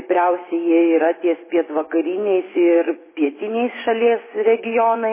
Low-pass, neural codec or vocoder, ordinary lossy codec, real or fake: 3.6 kHz; vocoder, 24 kHz, 100 mel bands, Vocos; MP3, 16 kbps; fake